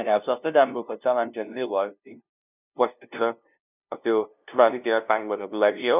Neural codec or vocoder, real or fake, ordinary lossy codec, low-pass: codec, 16 kHz, 0.5 kbps, FunCodec, trained on LibriTTS, 25 frames a second; fake; none; 3.6 kHz